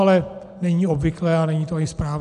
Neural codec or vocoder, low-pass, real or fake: none; 10.8 kHz; real